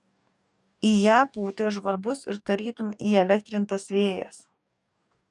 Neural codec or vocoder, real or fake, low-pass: codec, 44.1 kHz, 2.6 kbps, DAC; fake; 10.8 kHz